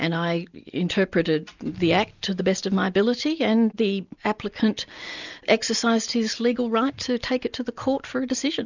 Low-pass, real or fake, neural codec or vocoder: 7.2 kHz; real; none